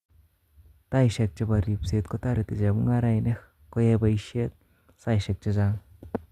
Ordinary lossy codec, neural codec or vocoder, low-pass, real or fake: Opus, 64 kbps; none; 14.4 kHz; real